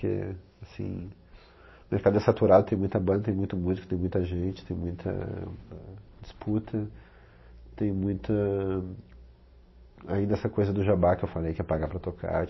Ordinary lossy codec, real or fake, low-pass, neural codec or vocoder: MP3, 24 kbps; real; 7.2 kHz; none